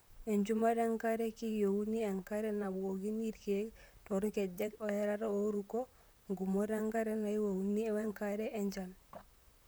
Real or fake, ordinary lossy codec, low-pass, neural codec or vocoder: fake; none; none; vocoder, 44.1 kHz, 128 mel bands, Pupu-Vocoder